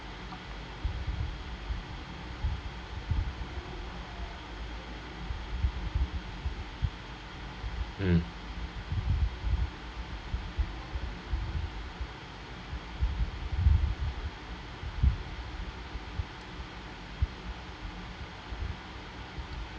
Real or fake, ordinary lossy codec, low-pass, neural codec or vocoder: real; none; none; none